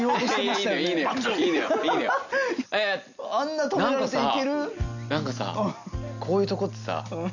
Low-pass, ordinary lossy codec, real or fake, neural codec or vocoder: 7.2 kHz; none; real; none